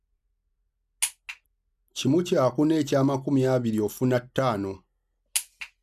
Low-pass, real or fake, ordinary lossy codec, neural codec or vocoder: 14.4 kHz; real; none; none